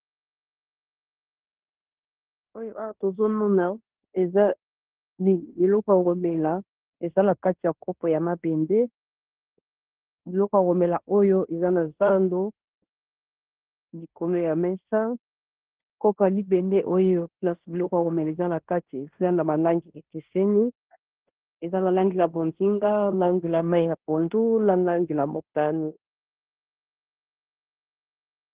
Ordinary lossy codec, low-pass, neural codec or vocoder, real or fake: Opus, 16 kbps; 3.6 kHz; codec, 16 kHz in and 24 kHz out, 0.9 kbps, LongCat-Audio-Codec, fine tuned four codebook decoder; fake